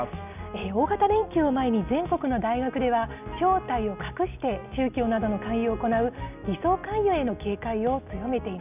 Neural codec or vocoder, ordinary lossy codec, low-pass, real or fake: none; none; 3.6 kHz; real